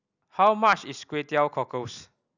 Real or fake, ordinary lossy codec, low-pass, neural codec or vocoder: real; none; 7.2 kHz; none